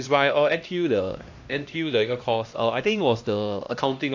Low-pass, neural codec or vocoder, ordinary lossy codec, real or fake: 7.2 kHz; codec, 16 kHz, 1 kbps, X-Codec, HuBERT features, trained on LibriSpeech; AAC, 48 kbps; fake